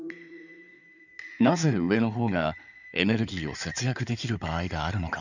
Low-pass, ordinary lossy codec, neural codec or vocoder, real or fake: 7.2 kHz; none; codec, 16 kHz in and 24 kHz out, 2.2 kbps, FireRedTTS-2 codec; fake